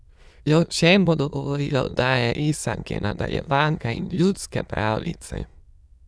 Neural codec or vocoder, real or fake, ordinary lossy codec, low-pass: autoencoder, 22.05 kHz, a latent of 192 numbers a frame, VITS, trained on many speakers; fake; none; none